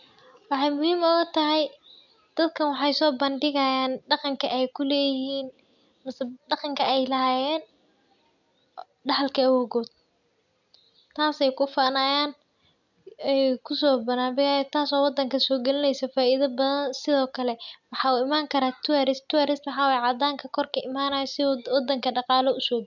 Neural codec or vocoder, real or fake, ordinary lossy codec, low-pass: none; real; none; 7.2 kHz